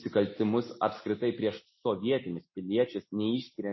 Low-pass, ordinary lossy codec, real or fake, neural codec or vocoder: 7.2 kHz; MP3, 24 kbps; real; none